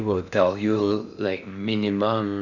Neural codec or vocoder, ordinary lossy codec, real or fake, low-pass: codec, 16 kHz in and 24 kHz out, 0.6 kbps, FocalCodec, streaming, 4096 codes; none; fake; 7.2 kHz